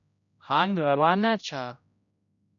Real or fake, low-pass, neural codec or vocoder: fake; 7.2 kHz; codec, 16 kHz, 0.5 kbps, X-Codec, HuBERT features, trained on general audio